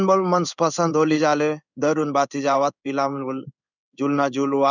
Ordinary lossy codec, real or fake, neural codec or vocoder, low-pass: none; fake; codec, 16 kHz in and 24 kHz out, 1 kbps, XY-Tokenizer; 7.2 kHz